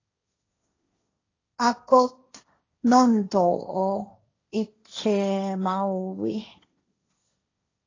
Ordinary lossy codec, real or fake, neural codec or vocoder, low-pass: AAC, 32 kbps; fake; codec, 16 kHz, 1.1 kbps, Voila-Tokenizer; 7.2 kHz